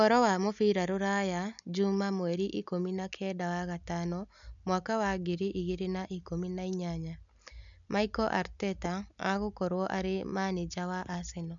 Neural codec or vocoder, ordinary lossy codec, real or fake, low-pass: none; none; real; 7.2 kHz